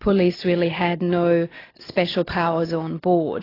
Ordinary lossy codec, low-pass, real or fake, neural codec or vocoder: AAC, 24 kbps; 5.4 kHz; real; none